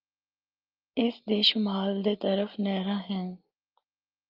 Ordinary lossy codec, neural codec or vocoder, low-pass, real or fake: Opus, 24 kbps; none; 5.4 kHz; real